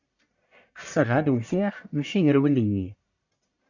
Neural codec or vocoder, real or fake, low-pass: codec, 44.1 kHz, 1.7 kbps, Pupu-Codec; fake; 7.2 kHz